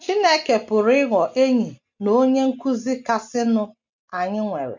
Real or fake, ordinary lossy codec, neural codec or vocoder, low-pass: real; MP3, 64 kbps; none; 7.2 kHz